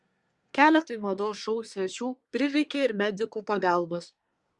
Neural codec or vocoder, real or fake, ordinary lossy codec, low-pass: codec, 24 kHz, 1 kbps, SNAC; fake; Opus, 64 kbps; 10.8 kHz